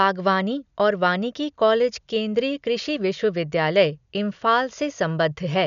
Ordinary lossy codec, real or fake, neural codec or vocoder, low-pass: none; real; none; 7.2 kHz